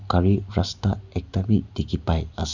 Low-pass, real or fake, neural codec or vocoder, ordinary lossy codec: 7.2 kHz; real; none; none